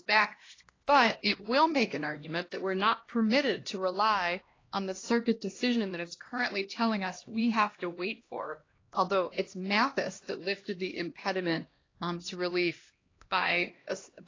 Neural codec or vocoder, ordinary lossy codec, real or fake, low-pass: codec, 16 kHz, 1 kbps, X-Codec, HuBERT features, trained on LibriSpeech; AAC, 32 kbps; fake; 7.2 kHz